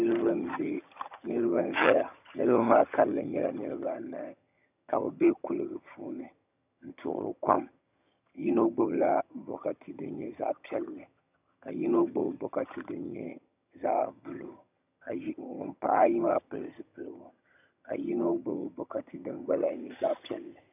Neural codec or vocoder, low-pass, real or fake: vocoder, 22.05 kHz, 80 mel bands, HiFi-GAN; 3.6 kHz; fake